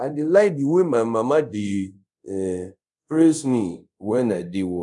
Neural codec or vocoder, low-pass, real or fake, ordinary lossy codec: codec, 24 kHz, 0.5 kbps, DualCodec; 10.8 kHz; fake; MP3, 96 kbps